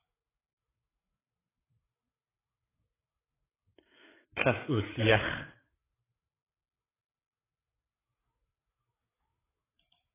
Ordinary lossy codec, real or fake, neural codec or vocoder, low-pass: AAC, 16 kbps; fake; codec, 16 kHz, 8 kbps, FreqCodec, larger model; 3.6 kHz